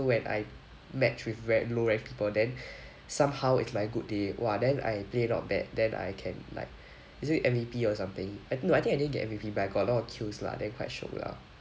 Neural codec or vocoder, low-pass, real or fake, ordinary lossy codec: none; none; real; none